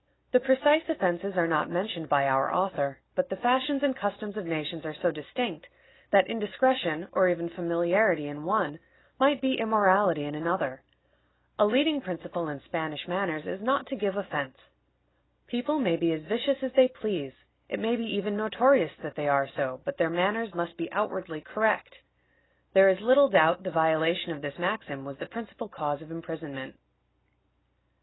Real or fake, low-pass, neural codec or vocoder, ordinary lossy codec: real; 7.2 kHz; none; AAC, 16 kbps